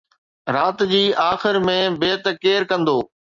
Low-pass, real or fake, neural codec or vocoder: 7.2 kHz; real; none